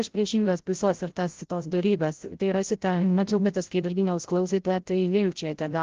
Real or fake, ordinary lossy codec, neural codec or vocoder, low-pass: fake; Opus, 16 kbps; codec, 16 kHz, 0.5 kbps, FreqCodec, larger model; 7.2 kHz